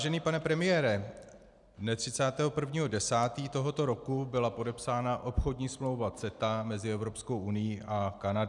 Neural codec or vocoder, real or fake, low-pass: none; real; 10.8 kHz